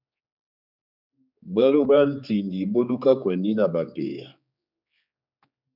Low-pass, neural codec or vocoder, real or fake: 5.4 kHz; codec, 16 kHz, 4 kbps, X-Codec, HuBERT features, trained on general audio; fake